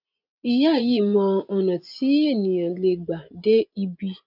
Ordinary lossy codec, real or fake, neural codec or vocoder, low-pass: none; fake; vocoder, 24 kHz, 100 mel bands, Vocos; 5.4 kHz